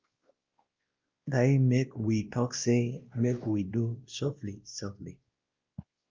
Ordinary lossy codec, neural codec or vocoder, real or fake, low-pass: Opus, 32 kbps; codec, 16 kHz, 1 kbps, X-Codec, WavLM features, trained on Multilingual LibriSpeech; fake; 7.2 kHz